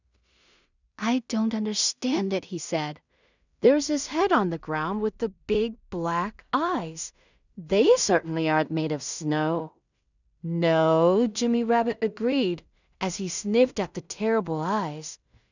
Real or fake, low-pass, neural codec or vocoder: fake; 7.2 kHz; codec, 16 kHz in and 24 kHz out, 0.4 kbps, LongCat-Audio-Codec, two codebook decoder